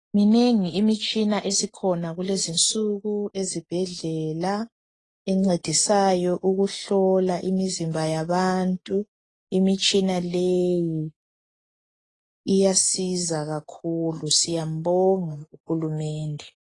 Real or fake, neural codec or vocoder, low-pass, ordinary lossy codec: real; none; 10.8 kHz; AAC, 32 kbps